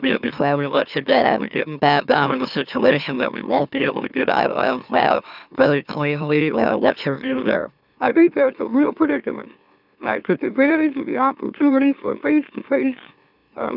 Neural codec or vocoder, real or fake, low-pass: autoencoder, 44.1 kHz, a latent of 192 numbers a frame, MeloTTS; fake; 5.4 kHz